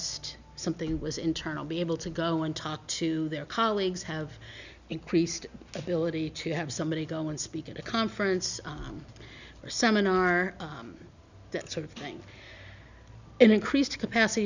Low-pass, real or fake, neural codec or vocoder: 7.2 kHz; real; none